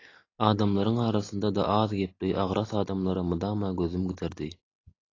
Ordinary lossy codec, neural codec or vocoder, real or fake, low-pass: AAC, 32 kbps; none; real; 7.2 kHz